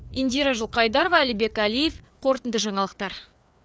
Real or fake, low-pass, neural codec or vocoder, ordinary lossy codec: fake; none; codec, 16 kHz, 4 kbps, FreqCodec, larger model; none